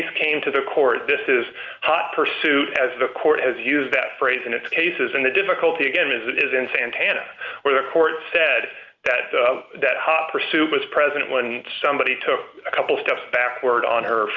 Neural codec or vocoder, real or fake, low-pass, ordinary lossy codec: none; real; 7.2 kHz; Opus, 24 kbps